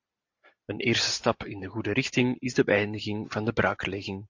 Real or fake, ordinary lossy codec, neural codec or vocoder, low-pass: real; AAC, 48 kbps; none; 7.2 kHz